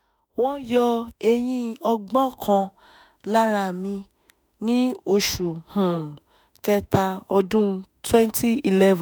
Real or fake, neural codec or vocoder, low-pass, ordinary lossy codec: fake; autoencoder, 48 kHz, 32 numbers a frame, DAC-VAE, trained on Japanese speech; none; none